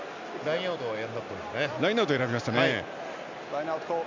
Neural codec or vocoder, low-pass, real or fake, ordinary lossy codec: none; 7.2 kHz; real; MP3, 64 kbps